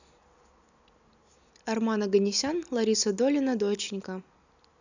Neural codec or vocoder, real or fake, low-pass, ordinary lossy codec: none; real; 7.2 kHz; none